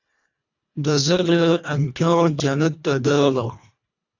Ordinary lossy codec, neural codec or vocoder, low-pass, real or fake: AAC, 48 kbps; codec, 24 kHz, 1.5 kbps, HILCodec; 7.2 kHz; fake